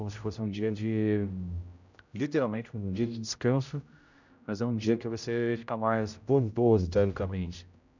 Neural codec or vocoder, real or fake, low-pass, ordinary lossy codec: codec, 16 kHz, 0.5 kbps, X-Codec, HuBERT features, trained on general audio; fake; 7.2 kHz; none